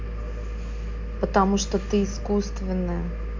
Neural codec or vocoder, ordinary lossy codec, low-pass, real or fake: none; MP3, 64 kbps; 7.2 kHz; real